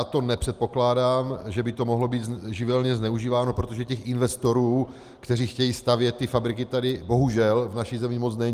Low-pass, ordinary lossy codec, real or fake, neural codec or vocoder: 14.4 kHz; Opus, 24 kbps; real; none